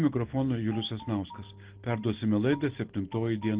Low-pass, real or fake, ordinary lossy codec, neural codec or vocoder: 3.6 kHz; real; Opus, 16 kbps; none